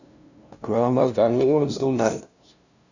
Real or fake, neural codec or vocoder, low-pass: fake; codec, 16 kHz, 0.5 kbps, FunCodec, trained on LibriTTS, 25 frames a second; 7.2 kHz